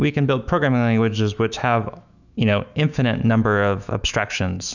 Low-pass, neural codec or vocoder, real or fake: 7.2 kHz; autoencoder, 48 kHz, 128 numbers a frame, DAC-VAE, trained on Japanese speech; fake